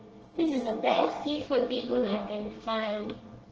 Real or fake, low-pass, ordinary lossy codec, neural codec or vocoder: fake; 7.2 kHz; Opus, 16 kbps; codec, 24 kHz, 1 kbps, SNAC